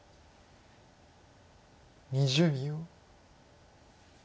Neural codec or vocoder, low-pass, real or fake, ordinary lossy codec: none; none; real; none